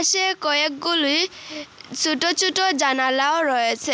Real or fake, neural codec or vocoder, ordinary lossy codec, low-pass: real; none; none; none